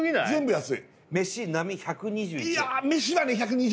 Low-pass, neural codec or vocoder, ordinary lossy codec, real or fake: none; none; none; real